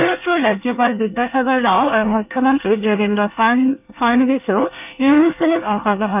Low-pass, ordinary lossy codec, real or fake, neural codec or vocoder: 3.6 kHz; none; fake; codec, 24 kHz, 1 kbps, SNAC